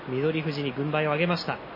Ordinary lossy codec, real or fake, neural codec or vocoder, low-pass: MP3, 24 kbps; real; none; 5.4 kHz